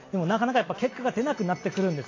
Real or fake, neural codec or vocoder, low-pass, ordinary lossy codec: real; none; 7.2 kHz; AAC, 32 kbps